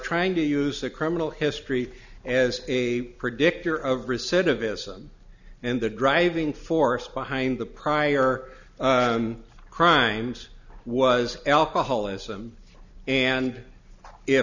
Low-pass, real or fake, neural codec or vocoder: 7.2 kHz; real; none